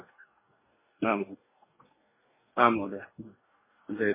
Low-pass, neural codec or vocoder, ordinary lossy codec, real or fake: 3.6 kHz; codec, 44.1 kHz, 2.6 kbps, DAC; MP3, 16 kbps; fake